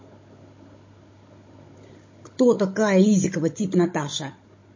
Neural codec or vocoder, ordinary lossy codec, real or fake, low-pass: codec, 16 kHz, 16 kbps, FunCodec, trained on Chinese and English, 50 frames a second; MP3, 32 kbps; fake; 7.2 kHz